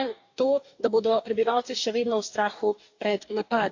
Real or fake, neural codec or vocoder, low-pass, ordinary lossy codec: fake; codec, 44.1 kHz, 2.6 kbps, DAC; 7.2 kHz; AAC, 48 kbps